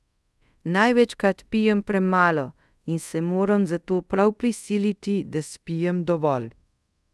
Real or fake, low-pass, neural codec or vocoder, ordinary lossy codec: fake; none; codec, 24 kHz, 0.5 kbps, DualCodec; none